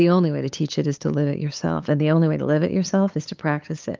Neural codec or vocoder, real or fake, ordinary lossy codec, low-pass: codec, 16 kHz, 8 kbps, FunCodec, trained on LibriTTS, 25 frames a second; fake; Opus, 32 kbps; 7.2 kHz